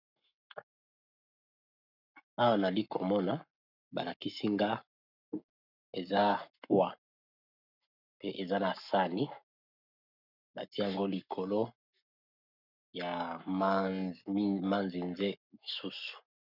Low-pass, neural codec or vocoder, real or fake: 5.4 kHz; codec, 44.1 kHz, 7.8 kbps, Pupu-Codec; fake